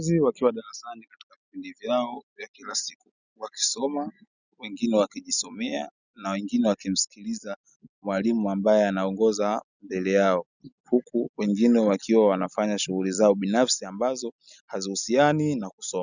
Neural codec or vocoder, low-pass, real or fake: none; 7.2 kHz; real